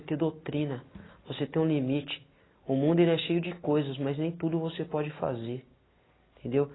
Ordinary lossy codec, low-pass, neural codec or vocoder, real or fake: AAC, 16 kbps; 7.2 kHz; none; real